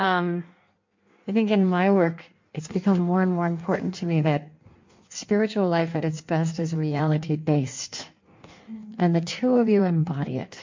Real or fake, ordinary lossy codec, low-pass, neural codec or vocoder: fake; MP3, 48 kbps; 7.2 kHz; codec, 16 kHz in and 24 kHz out, 1.1 kbps, FireRedTTS-2 codec